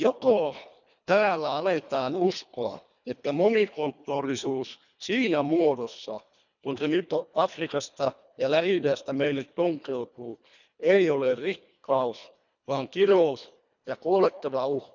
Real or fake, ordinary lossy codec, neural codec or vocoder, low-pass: fake; none; codec, 24 kHz, 1.5 kbps, HILCodec; 7.2 kHz